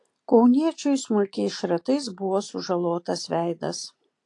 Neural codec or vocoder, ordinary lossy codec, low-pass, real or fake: none; AAC, 48 kbps; 10.8 kHz; real